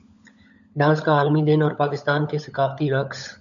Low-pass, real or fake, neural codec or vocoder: 7.2 kHz; fake; codec, 16 kHz, 16 kbps, FunCodec, trained on LibriTTS, 50 frames a second